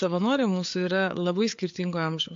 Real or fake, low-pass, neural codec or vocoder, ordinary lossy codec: fake; 7.2 kHz; codec, 16 kHz, 4 kbps, FreqCodec, larger model; MP3, 48 kbps